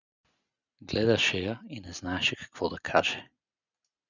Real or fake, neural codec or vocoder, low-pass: real; none; 7.2 kHz